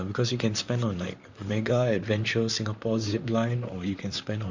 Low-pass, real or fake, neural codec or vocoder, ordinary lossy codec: 7.2 kHz; fake; vocoder, 44.1 kHz, 128 mel bands, Pupu-Vocoder; Opus, 64 kbps